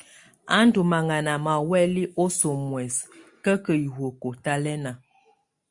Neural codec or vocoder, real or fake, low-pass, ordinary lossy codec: none; real; 10.8 kHz; Opus, 64 kbps